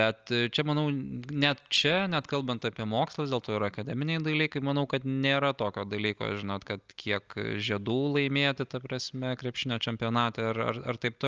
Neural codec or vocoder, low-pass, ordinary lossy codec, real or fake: none; 7.2 kHz; Opus, 24 kbps; real